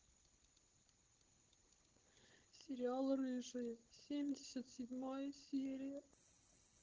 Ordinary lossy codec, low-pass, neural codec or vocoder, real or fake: Opus, 16 kbps; 7.2 kHz; codec, 16 kHz, 16 kbps, FunCodec, trained on Chinese and English, 50 frames a second; fake